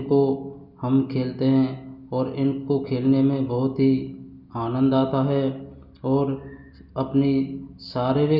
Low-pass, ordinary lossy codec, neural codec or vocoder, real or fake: 5.4 kHz; Opus, 64 kbps; none; real